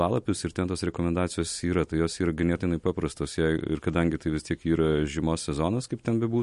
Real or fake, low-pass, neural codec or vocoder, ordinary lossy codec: real; 14.4 kHz; none; MP3, 48 kbps